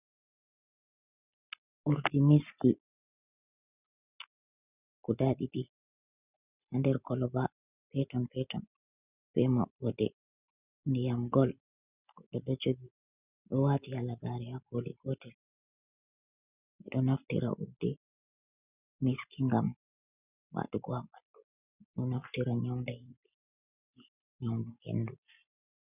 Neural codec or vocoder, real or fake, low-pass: none; real; 3.6 kHz